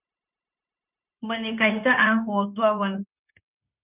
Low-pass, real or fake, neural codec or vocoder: 3.6 kHz; fake; codec, 16 kHz, 0.9 kbps, LongCat-Audio-Codec